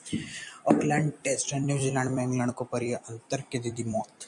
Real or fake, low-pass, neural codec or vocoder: fake; 10.8 kHz; vocoder, 44.1 kHz, 128 mel bands every 256 samples, BigVGAN v2